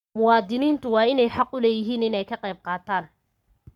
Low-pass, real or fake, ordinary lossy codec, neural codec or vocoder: 19.8 kHz; fake; none; codec, 44.1 kHz, 7.8 kbps, Pupu-Codec